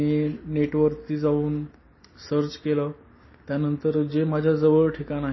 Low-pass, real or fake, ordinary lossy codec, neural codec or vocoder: 7.2 kHz; real; MP3, 24 kbps; none